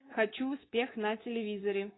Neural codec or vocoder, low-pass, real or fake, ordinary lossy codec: codec, 24 kHz, 3.1 kbps, DualCodec; 7.2 kHz; fake; AAC, 16 kbps